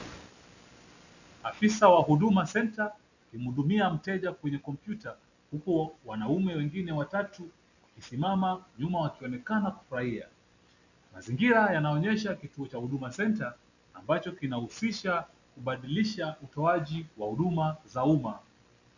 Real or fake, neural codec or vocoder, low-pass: real; none; 7.2 kHz